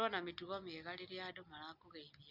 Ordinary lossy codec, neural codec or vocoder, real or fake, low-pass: Opus, 64 kbps; none; real; 5.4 kHz